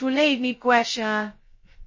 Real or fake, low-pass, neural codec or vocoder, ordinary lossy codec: fake; 7.2 kHz; codec, 16 kHz, 0.2 kbps, FocalCodec; MP3, 32 kbps